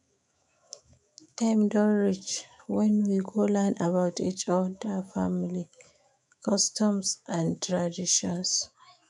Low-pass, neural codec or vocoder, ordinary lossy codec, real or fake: 10.8 kHz; autoencoder, 48 kHz, 128 numbers a frame, DAC-VAE, trained on Japanese speech; none; fake